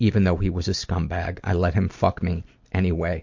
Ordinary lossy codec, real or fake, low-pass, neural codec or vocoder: MP3, 48 kbps; real; 7.2 kHz; none